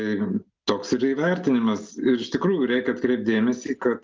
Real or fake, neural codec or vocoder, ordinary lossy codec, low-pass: real; none; Opus, 24 kbps; 7.2 kHz